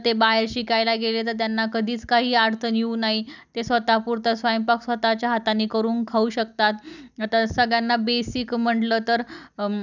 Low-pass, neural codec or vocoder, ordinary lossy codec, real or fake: 7.2 kHz; none; none; real